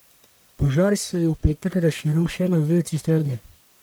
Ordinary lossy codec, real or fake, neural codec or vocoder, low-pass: none; fake; codec, 44.1 kHz, 1.7 kbps, Pupu-Codec; none